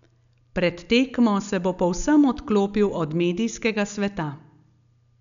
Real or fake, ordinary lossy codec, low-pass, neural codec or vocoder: real; none; 7.2 kHz; none